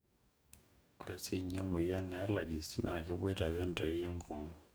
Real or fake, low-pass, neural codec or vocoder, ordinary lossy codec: fake; none; codec, 44.1 kHz, 2.6 kbps, DAC; none